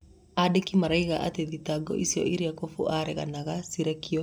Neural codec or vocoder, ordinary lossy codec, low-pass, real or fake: none; none; 19.8 kHz; real